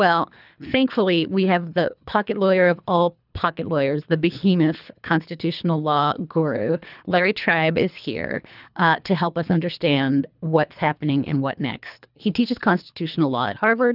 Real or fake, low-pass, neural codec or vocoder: fake; 5.4 kHz; codec, 24 kHz, 3 kbps, HILCodec